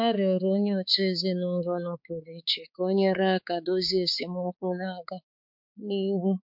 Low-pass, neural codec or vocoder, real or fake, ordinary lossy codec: 5.4 kHz; codec, 16 kHz, 4 kbps, X-Codec, HuBERT features, trained on balanced general audio; fake; MP3, 48 kbps